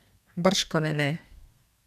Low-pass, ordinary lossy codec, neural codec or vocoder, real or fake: 14.4 kHz; none; codec, 32 kHz, 1.9 kbps, SNAC; fake